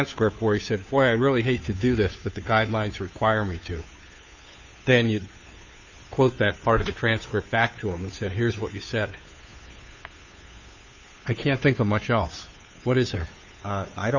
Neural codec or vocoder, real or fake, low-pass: codec, 16 kHz, 2 kbps, FunCodec, trained on Chinese and English, 25 frames a second; fake; 7.2 kHz